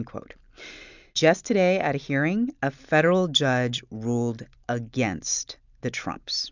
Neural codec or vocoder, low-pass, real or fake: none; 7.2 kHz; real